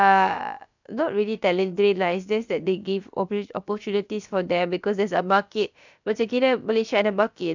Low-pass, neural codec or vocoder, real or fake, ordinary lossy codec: 7.2 kHz; codec, 16 kHz, about 1 kbps, DyCAST, with the encoder's durations; fake; none